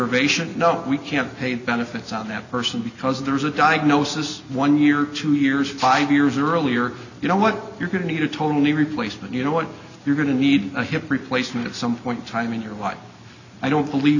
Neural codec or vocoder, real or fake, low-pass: none; real; 7.2 kHz